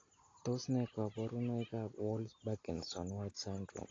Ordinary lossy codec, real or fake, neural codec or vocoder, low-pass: AAC, 32 kbps; real; none; 7.2 kHz